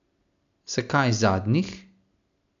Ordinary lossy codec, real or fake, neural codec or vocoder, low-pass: MP3, 64 kbps; real; none; 7.2 kHz